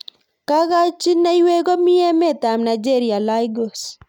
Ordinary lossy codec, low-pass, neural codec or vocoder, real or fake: none; 19.8 kHz; none; real